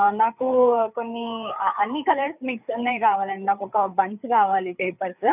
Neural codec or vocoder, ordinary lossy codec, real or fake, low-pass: codec, 16 kHz, 4 kbps, FreqCodec, larger model; none; fake; 3.6 kHz